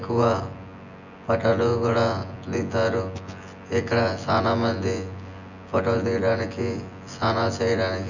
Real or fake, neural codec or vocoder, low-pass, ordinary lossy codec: fake; vocoder, 24 kHz, 100 mel bands, Vocos; 7.2 kHz; none